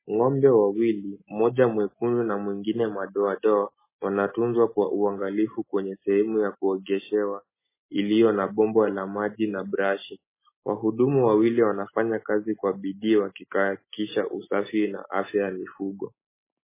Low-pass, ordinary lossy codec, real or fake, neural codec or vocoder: 3.6 kHz; MP3, 16 kbps; real; none